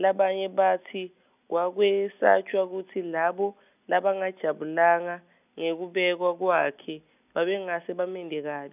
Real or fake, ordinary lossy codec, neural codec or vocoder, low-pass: real; none; none; 3.6 kHz